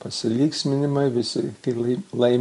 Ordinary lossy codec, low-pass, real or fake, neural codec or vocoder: MP3, 48 kbps; 14.4 kHz; real; none